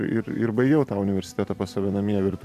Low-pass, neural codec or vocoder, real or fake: 14.4 kHz; vocoder, 44.1 kHz, 128 mel bands every 512 samples, BigVGAN v2; fake